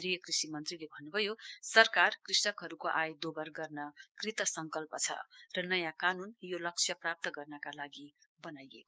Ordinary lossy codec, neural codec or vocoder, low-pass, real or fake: none; codec, 16 kHz, 6 kbps, DAC; none; fake